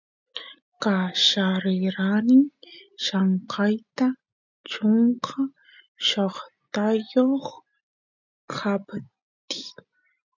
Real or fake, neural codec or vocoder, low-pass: real; none; 7.2 kHz